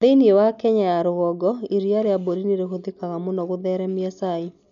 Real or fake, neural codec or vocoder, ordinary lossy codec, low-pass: real; none; none; 7.2 kHz